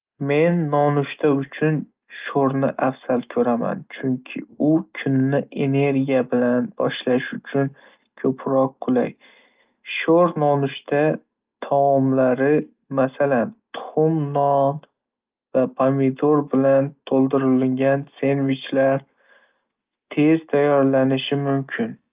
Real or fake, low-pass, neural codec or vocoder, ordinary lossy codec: real; 3.6 kHz; none; Opus, 32 kbps